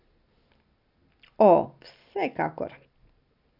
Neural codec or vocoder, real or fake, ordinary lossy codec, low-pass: none; real; none; 5.4 kHz